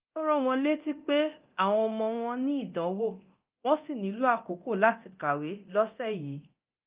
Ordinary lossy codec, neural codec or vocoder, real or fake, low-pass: Opus, 24 kbps; codec, 24 kHz, 0.9 kbps, DualCodec; fake; 3.6 kHz